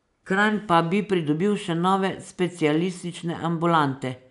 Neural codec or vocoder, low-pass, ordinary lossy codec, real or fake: none; 10.8 kHz; none; real